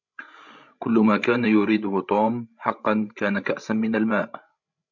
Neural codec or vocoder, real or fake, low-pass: codec, 16 kHz, 16 kbps, FreqCodec, larger model; fake; 7.2 kHz